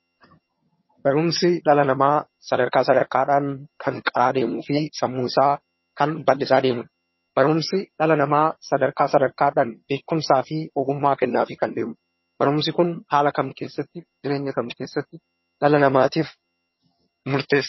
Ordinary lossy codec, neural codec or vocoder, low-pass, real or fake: MP3, 24 kbps; vocoder, 22.05 kHz, 80 mel bands, HiFi-GAN; 7.2 kHz; fake